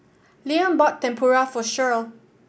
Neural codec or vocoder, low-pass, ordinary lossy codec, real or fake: none; none; none; real